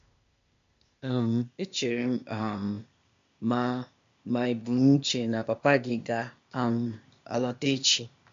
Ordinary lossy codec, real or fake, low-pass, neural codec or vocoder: MP3, 48 kbps; fake; 7.2 kHz; codec, 16 kHz, 0.8 kbps, ZipCodec